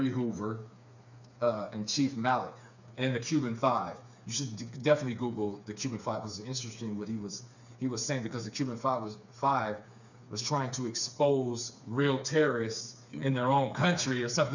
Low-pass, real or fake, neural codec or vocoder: 7.2 kHz; fake; codec, 16 kHz, 4 kbps, FreqCodec, smaller model